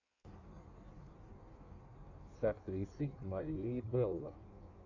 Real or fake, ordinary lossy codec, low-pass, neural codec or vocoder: fake; AAC, 48 kbps; 7.2 kHz; codec, 16 kHz in and 24 kHz out, 1.1 kbps, FireRedTTS-2 codec